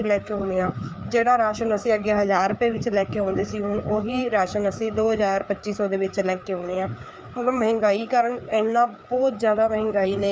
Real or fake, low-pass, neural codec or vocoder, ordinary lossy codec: fake; none; codec, 16 kHz, 4 kbps, FreqCodec, larger model; none